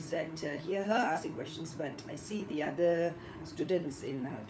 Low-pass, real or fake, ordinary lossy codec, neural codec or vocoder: none; fake; none; codec, 16 kHz, 4 kbps, FunCodec, trained on LibriTTS, 50 frames a second